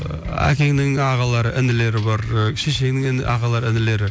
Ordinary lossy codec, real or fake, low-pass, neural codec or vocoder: none; real; none; none